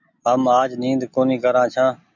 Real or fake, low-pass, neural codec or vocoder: real; 7.2 kHz; none